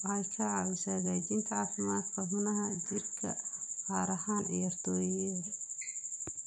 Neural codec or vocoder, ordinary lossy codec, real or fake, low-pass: vocoder, 44.1 kHz, 128 mel bands every 256 samples, BigVGAN v2; none; fake; 9.9 kHz